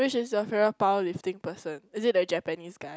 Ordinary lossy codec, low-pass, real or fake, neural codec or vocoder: none; none; real; none